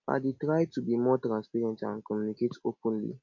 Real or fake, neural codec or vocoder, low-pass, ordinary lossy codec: real; none; 7.2 kHz; MP3, 48 kbps